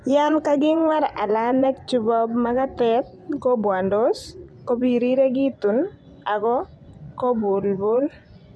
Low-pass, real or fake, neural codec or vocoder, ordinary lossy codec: none; fake; vocoder, 24 kHz, 100 mel bands, Vocos; none